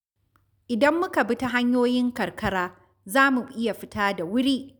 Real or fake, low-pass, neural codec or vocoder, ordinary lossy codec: real; 19.8 kHz; none; none